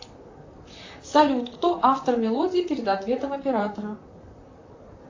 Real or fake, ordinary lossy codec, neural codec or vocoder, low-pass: fake; AAC, 48 kbps; vocoder, 44.1 kHz, 128 mel bands, Pupu-Vocoder; 7.2 kHz